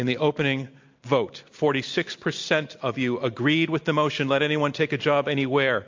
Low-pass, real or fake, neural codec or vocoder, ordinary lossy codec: 7.2 kHz; real; none; MP3, 48 kbps